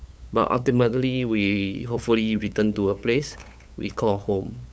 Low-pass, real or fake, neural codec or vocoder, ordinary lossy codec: none; fake; codec, 16 kHz, 8 kbps, FunCodec, trained on LibriTTS, 25 frames a second; none